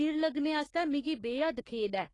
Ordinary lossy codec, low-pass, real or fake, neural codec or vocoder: AAC, 32 kbps; 10.8 kHz; fake; codec, 44.1 kHz, 3.4 kbps, Pupu-Codec